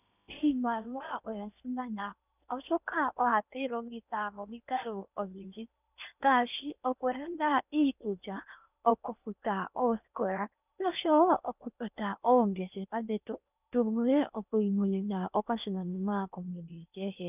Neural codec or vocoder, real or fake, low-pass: codec, 16 kHz in and 24 kHz out, 0.8 kbps, FocalCodec, streaming, 65536 codes; fake; 3.6 kHz